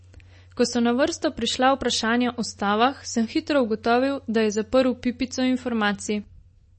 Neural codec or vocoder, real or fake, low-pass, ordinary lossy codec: none; real; 10.8 kHz; MP3, 32 kbps